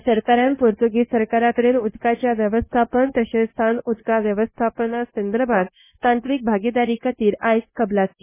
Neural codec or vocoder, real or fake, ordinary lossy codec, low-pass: codec, 16 kHz, 0.9 kbps, LongCat-Audio-Codec; fake; MP3, 16 kbps; 3.6 kHz